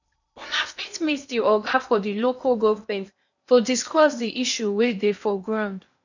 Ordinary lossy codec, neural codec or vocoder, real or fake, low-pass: none; codec, 16 kHz in and 24 kHz out, 0.8 kbps, FocalCodec, streaming, 65536 codes; fake; 7.2 kHz